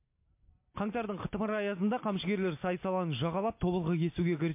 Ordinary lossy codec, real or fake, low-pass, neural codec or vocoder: MP3, 24 kbps; real; 3.6 kHz; none